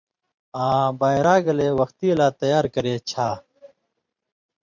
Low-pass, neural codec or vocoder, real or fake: 7.2 kHz; vocoder, 44.1 kHz, 128 mel bands every 512 samples, BigVGAN v2; fake